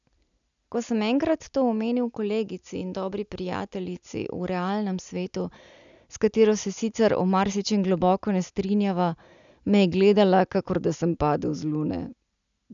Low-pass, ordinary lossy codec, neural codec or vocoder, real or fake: 7.2 kHz; MP3, 96 kbps; none; real